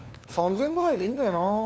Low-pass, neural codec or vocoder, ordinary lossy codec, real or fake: none; codec, 16 kHz, 2 kbps, FunCodec, trained on LibriTTS, 25 frames a second; none; fake